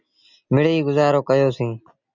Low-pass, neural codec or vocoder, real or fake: 7.2 kHz; none; real